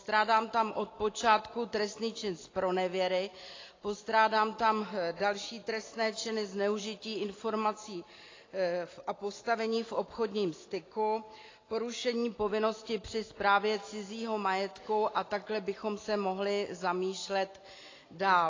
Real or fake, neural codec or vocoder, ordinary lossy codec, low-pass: real; none; AAC, 32 kbps; 7.2 kHz